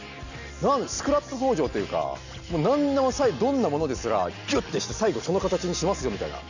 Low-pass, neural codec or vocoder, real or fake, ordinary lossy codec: 7.2 kHz; none; real; none